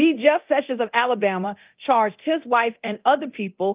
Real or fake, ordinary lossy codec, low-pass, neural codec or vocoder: fake; Opus, 24 kbps; 3.6 kHz; codec, 24 kHz, 0.9 kbps, DualCodec